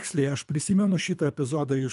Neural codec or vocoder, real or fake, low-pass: codec, 24 kHz, 3 kbps, HILCodec; fake; 10.8 kHz